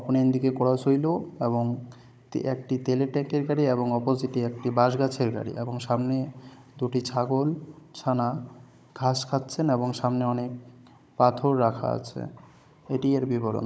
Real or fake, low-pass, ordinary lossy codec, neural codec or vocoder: fake; none; none; codec, 16 kHz, 16 kbps, FunCodec, trained on Chinese and English, 50 frames a second